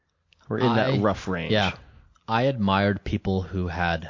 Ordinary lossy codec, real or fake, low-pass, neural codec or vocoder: MP3, 48 kbps; real; 7.2 kHz; none